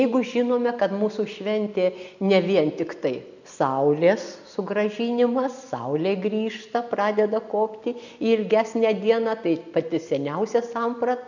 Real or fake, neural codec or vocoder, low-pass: real; none; 7.2 kHz